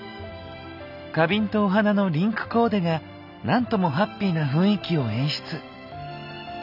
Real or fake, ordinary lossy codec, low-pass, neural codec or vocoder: real; none; 5.4 kHz; none